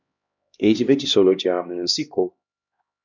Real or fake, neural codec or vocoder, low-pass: fake; codec, 16 kHz, 1 kbps, X-Codec, HuBERT features, trained on LibriSpeech; 7.2 kHz